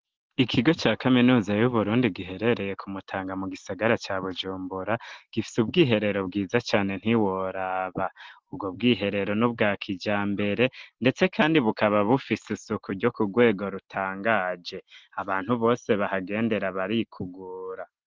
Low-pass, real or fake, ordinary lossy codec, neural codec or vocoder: 7.2 kHz; real; Opus, 16 kbps; none